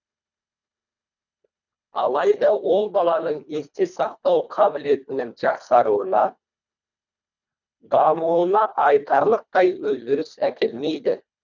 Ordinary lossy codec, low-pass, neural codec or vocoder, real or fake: none; 7.2 kHz; codec, 24 kHz, 1.5 kbps, HILCodec; fake